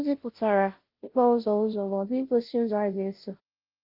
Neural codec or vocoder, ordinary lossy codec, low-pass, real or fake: codec, 16 kHz, 0.5 kbps, FunCodec, trained on Chinese and English, 25 frames a second; Opus, 32 kbps; 5.4 kHz; fake